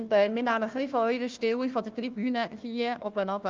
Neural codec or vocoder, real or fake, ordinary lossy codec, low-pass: codec, 16 kHz, 1 kbps, FunCodec, trained on Chinese and English, 50 frames a second; fake; Opus, 24 kbps; 7.2 kHz